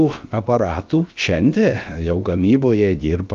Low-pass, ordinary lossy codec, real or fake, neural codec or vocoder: 7.2 kHz; Opus, 32 kbps; fake; codec, 16 kHz, about 1 kbps, DyCAST, with the encoder's durations